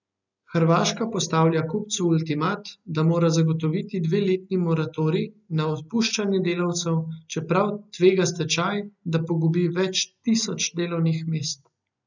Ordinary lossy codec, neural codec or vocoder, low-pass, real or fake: none; none; 7.2 kHz; real